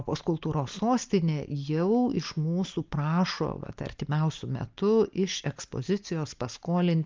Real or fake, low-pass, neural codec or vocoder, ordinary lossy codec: real; 7.2 kHz; none; Opus, 24 kbps